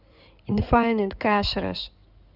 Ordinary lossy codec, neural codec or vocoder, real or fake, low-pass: none; codec, 16 kHz in and 24 kHz out, 2.2 kbps, FireRedTTS-2 codec; fake; 5.4 kHz